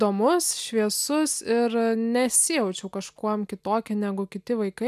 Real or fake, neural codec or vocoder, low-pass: real; none; 14.4 kHz